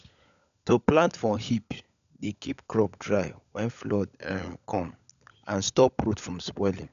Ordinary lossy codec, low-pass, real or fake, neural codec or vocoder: none; 7.2 kHz; fake; codec, 16 kHz, 16 kbps, FunCodec, trained on LibriTTS, 50 frames a second